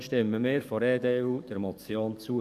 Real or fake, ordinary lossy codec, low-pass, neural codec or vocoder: fake; none; 14.4 kHz; codec, 44.1 kHz, 7.8 kbps, DAC